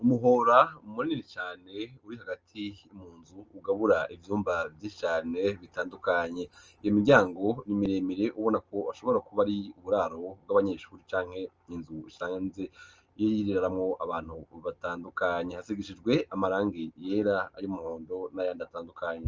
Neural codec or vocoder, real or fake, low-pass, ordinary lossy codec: none; real; 7.2 kHz; Opus, 24 kbps